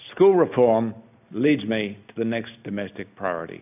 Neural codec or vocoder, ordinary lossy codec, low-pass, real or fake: none; AAC, 32 kbps; 3.6 kHz; real